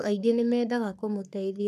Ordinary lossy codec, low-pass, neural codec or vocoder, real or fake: none; 14.4 kHz; codec, 44.1 kHz, 3.4 kbps, Pupu-Codec; fake